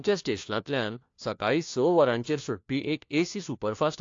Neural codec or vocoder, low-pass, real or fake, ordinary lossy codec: codec, 16 kHz, 1 kbps, FunCodec, trained on LibriTTS, 50 frames a second; 7.2 kHz; fake; AAC, 48 kbps